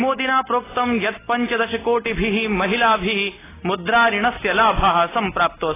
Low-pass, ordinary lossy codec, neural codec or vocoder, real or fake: 3.6 kHz; AAC, 16 kbps; none; real